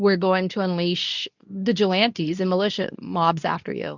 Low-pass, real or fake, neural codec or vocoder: 7.2 kHz; fake; codec, 24 kHz, 0.9 kbps, WavTokenizer, medium speech release version 2